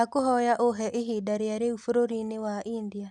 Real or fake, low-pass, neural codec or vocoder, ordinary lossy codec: real; none; none; none